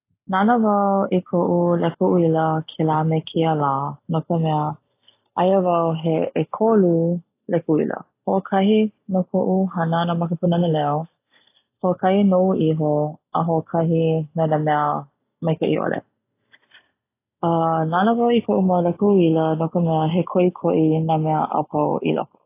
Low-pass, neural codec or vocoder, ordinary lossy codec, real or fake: 3.6 kHz; none; AAC, 24 kbps; real